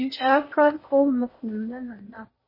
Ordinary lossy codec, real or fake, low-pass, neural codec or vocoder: MP3, 24 kbps; fake; 5.4 kHz; codec, 16 kHz in and 24 kHz out, 0.8 kbps, FocalCodec, streaming, 65536 codes